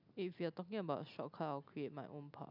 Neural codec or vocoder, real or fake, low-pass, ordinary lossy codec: none; real; 5.4 kHz; none